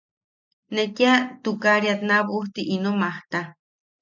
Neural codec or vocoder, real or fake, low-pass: none; real; 7.2 kHz